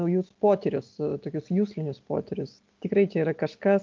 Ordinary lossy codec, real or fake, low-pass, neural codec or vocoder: Opus, 32 kbps; real; 7.2 kHz; none